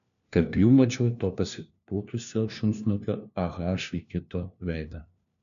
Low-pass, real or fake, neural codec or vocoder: 7.2 kHz; fake; codec, 16 kHz, 1 kbps, FunCodec, trained on LibriTTS, 50 frames a second